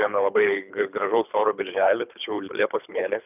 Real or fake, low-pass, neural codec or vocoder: fake; 3.6 kHz; codec, 24 kHz, 3 kbps, HILCodec